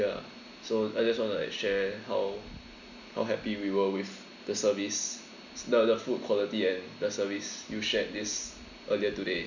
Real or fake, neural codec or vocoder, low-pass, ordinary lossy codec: real; none; 7.2 kHz; none